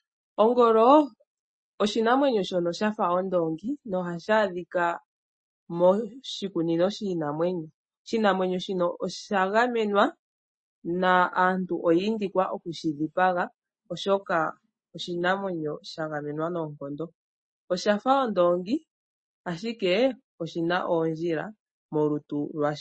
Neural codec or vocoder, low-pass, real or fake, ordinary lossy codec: none; 9.9 kHz; real; MP3, 32 kbps